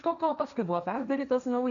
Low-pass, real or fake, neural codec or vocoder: 7.2 kHz; fake; codec, 16 kHz, 1.1 kbps, Voila-Tokenizer